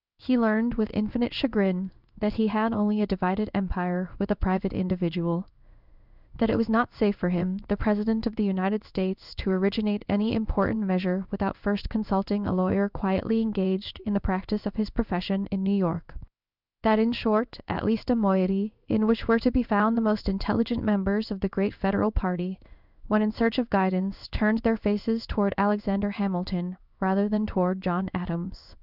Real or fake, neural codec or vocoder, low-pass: fake; codec, 16 kHz in and 24 kHz out, 1 kbps, XY-Tokenizer; 5.4 kHz